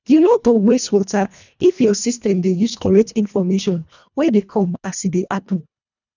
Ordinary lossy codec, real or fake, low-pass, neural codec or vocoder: none; fake; 7.2 kHz; codec, 24 kHz, 1.5 kbps, HILCodec